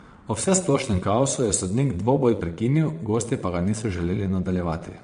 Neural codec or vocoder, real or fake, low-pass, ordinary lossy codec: vocoder, 22.05 kHz, 80 mel bands, Vocos; fake; 9.9 kHz; MP3, 48 kbps